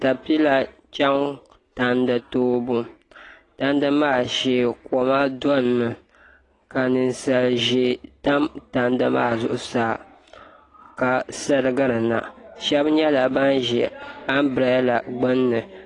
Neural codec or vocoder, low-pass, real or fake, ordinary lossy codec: none; 10.8 kHz; real; AAC, 32 kbps